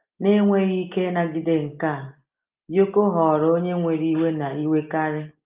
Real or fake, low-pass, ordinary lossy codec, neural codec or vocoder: real; 3.6 kHz; Opus, 32 kbps; none